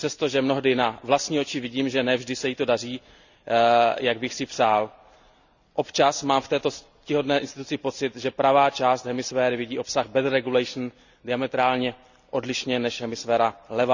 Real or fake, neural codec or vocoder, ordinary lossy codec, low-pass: real; none; none; 7.2 kHz